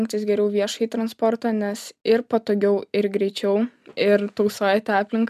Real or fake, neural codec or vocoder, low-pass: real; none; 14.4 kHz